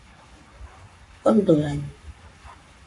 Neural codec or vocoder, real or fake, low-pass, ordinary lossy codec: codec, 44.1 kHz, 7.8 kbps, Pupu-Codec; fake; 10.8 kHz; AAC, 48 kbps